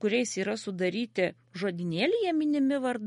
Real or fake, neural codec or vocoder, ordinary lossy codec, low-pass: real; none; MP3, 48 kbps; 19.8 kHz